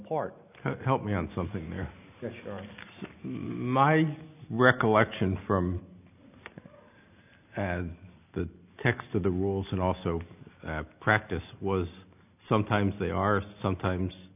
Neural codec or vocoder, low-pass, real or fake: none; 3.6 kHz; real